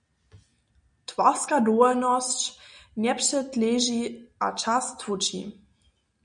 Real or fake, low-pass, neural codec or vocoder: real; 9.9 kHz; none